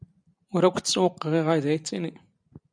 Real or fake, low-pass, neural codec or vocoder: real; 9.9 kHz; none